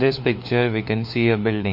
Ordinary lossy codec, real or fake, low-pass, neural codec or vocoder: MP3, 32 kbps; fake; 5.4 kHz; codec, 16 kHz, 4 kbps, FunCodec, trained on LibriTTS, 50 frames a second